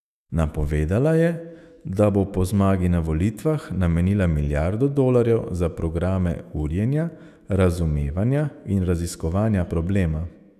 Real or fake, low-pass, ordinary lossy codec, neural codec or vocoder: fake; 14.4 kHz; none; autoencoder, 48 kHz, 128 numbers a frame, DAC-VAE, trained on Japanese speech